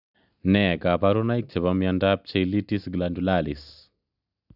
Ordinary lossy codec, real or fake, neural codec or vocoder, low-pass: none; real; none; 5.4 kHz